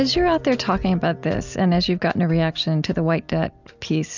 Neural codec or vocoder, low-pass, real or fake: none; 7.2 kHz; real